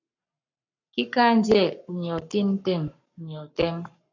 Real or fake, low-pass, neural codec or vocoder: fake; 7.2 kHz; codec, 44.1 kHz, 7.8 kbps, Pupu-Codec